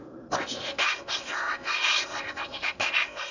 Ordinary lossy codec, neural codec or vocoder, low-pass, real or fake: none; codec, 16 kHz in and 24 kHz out, 0.8 kbps, FocalCodec, streaming, 65536 codes; 7.2 kHz; fake